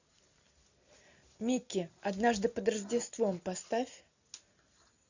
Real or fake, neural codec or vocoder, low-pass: real; none; 7.2 kHz